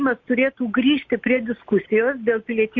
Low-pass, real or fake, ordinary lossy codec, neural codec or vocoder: 7.2 kHz; real; MP3, 48 kbps; none